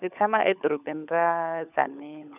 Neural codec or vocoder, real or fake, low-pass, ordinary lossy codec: codec, 16 kHz, 16 kbps, FunCodec, trained on LibriTTS, 50 frames a second; fake; 3.6 kHz; none